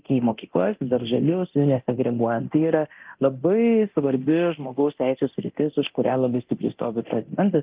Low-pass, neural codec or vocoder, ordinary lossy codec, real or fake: 3.6 kHz; codec, 24 kHz, 0.9 kbps, DualCodec; Opus, 32 kbps; fake